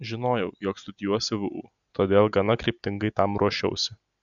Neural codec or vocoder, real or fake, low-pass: none; real; 7.2 kHz